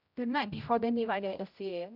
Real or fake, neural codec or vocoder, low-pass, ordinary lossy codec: fake; codec, 16 kHz, 0.5 kbps, X-Codec, HuBERT features, trained on general audio; 5.4 kHz; none